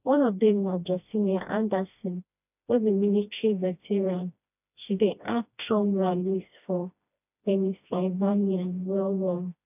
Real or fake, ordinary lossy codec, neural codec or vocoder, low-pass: fake; none; codec, 16 kHz, 1 kbps, FreqCodec, smaller model; 3.6 kHz